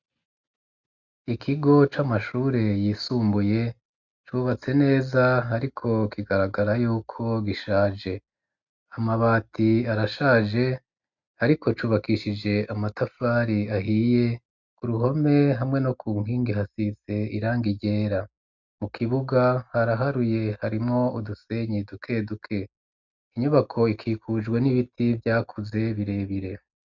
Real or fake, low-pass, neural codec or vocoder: real; 7.2 kHz; none